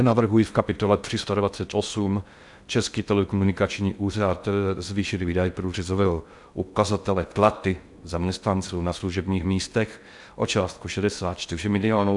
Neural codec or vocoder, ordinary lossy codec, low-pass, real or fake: codec, 16 kHz in and 24 kHz out, 0.6 kbps, FocalCodec, streaming, 2048 codes; MP3, 96 kbps; 10.8 kHz; fake